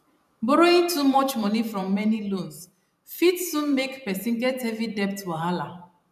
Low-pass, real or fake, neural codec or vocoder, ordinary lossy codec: 14.4 kHz; fake; vocoder, 44.1 kHz, 128 mel bands every 512 samples, BigVGAN v2; none